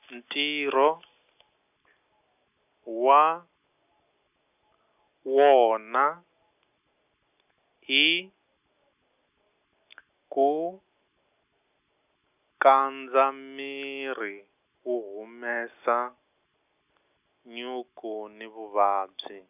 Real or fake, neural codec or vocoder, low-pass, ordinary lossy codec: real; none; 3.6 kHz; none